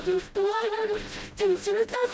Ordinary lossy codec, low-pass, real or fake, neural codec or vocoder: none; none; fake; codec, 16 kHz, 0.5 kbps, FreqCodec, smaller model